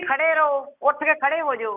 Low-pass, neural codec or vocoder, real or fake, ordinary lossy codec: 3.6 kHz; none; real; none